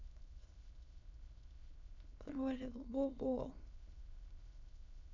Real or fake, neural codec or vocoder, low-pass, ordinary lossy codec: fake; autoencoder, 22.05 kHz, a latent of 192 numbers a frame, VITS, trained on many speakers; 7.2 kHz; none